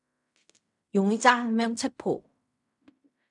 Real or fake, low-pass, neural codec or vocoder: fake; 10.8 kHz; codec, 16 kHz in and 24 kHz out, 0.4 kbps, LongCat-Audio-Codec, fine tuned four codebook decoder